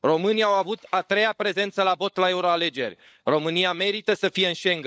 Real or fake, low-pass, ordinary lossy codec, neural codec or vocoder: fake; none; none; codec, 16 kHz, 16 kbps, FunCodec, trained on LibriTTS, 50 frames a second